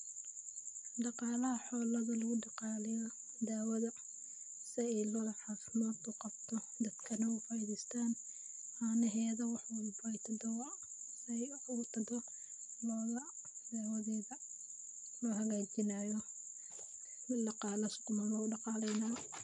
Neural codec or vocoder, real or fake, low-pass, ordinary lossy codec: none; real; 10.8 kHz; MP3, 64 kbps